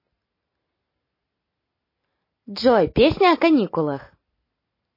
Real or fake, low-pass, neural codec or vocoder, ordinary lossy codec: real; 5.4 kHz; none; MP3, 24 kbps